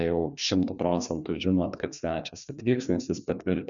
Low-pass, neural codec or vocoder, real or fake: 7.2 kHz; codec, 16 kHz, 2 kbps, FreqCodec, larger model; fake